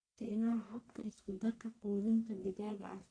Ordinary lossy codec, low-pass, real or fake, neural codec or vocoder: AAC, 48 kbps; 9.9 kHz; fake; codec, 44.1 kHz, 1.7 kbps, Pupu-Codec